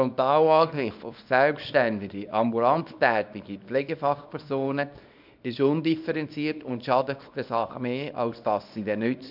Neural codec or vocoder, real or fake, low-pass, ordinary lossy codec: codec, 24 kHz, 0.9 kbps, WavTokenizer, small release; fake; 5.4 kHz; none